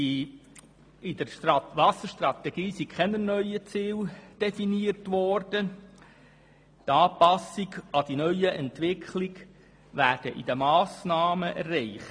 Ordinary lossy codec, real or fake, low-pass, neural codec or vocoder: AAC, 64 kbps; real; 9.9 kHz; none